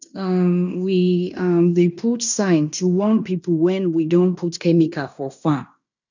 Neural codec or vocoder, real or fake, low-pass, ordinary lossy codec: codec, 16 kHz in and 24 kHz out, 0.9 kbps, LongCat-Audio-Codec, fine tuned four codebook decoder; fake; 7.2 kHz; none